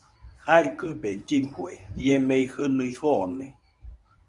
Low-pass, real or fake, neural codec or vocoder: 10.8 kHz; fake; codec, 24 kHz, 0.9 kbps, WavTokenizer, medium speech release version 1